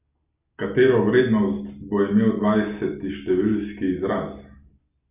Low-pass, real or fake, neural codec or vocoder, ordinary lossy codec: 3.6 kHz; real; none; none